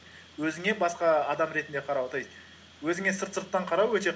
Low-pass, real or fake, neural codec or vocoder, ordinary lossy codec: none; real; none; none